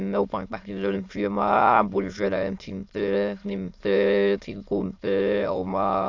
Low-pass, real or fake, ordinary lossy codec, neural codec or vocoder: 7.2 kHz; fake; AAC, 48 kbps; autoencoder, 22.05 kHz, a latent of 192 numbers a frame, VITS, trained on many speakers